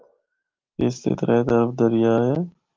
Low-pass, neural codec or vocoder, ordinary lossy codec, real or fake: 7.2 kHz; none; Opus, 32 kbps; real